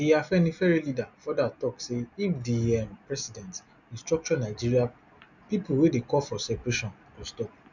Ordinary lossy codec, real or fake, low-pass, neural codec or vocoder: none; real; 7.2 kHz; none